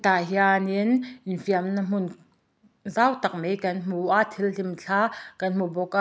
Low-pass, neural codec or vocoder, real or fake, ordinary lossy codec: none; none; real; none